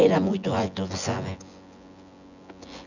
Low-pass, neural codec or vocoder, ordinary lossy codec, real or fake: 7.2 kHz; vocoder, 24 kHz, 100 mel bands, Vocos; none; fake